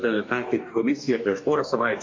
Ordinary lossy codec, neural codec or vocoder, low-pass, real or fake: MP3, 48 kbps; codec, 44.1 kHz, 2.6 kbps, DAC; 7.2 kHz; fake